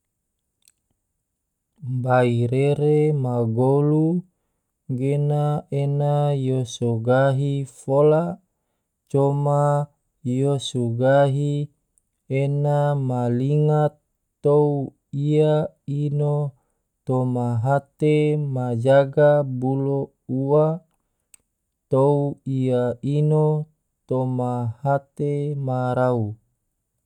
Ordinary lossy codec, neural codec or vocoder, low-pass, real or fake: none; none; 19.8 kHz; real